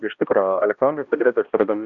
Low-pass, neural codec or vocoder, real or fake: 7.2 kHz; codec, 16 kHz, 1 kbps, X-Codec, HuBERT features, trained on balanced general audio; fake